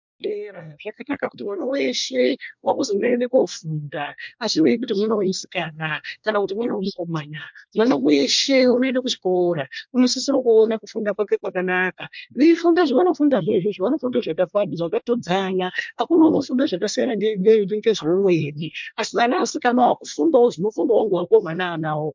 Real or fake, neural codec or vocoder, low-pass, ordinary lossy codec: fake; codec, 24 kHz, 1 kbps, SNAC; 7.2 kHz; MP3, 64 kbps